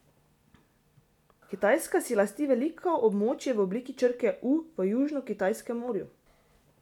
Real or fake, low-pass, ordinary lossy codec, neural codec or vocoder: real; 19.8 kHz; none; none